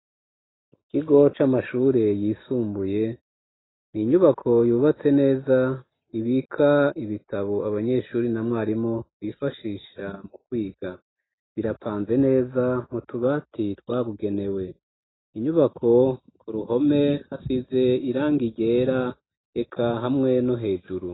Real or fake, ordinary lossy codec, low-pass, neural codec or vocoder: real; AAC, 16 kbps; 7.2 kHz; none